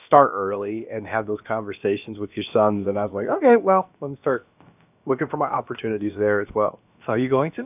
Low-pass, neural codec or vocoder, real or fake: 3.6 kHz; codec, 16 kHz, about 1 kbps, DyCAST, with the encoder's durations; fake